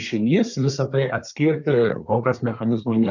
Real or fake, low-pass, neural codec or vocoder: fake; 7.2 kHz; codec, 24 kHz, 1 kbps, SNAC